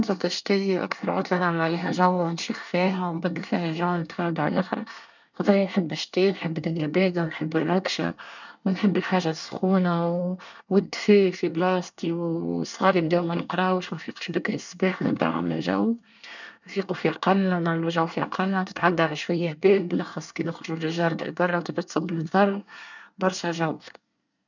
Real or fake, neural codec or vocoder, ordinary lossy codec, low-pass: fake; codec, 24 kHz, 1 kbps, SNAC; none; 7.2 kHz